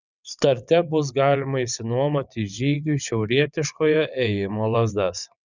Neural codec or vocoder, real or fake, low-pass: vocoder, 22.05 kHz, 80 mel bands, WaveNeXt; fake; 7.2 kHz